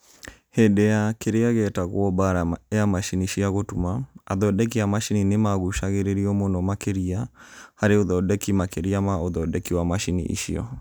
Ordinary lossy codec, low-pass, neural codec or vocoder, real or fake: none; none; none; real